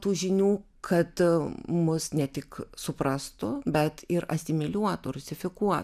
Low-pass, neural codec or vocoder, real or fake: 14.4 kHz; none; real